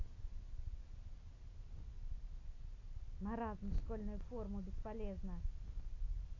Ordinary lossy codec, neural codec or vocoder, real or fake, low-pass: AAC, 32 kbps; none; real; 7.2 kHz